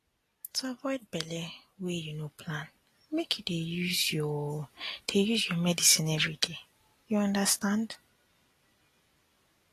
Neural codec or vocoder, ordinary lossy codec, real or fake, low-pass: none; AAC, 48 kbps; real; 14.4 kHz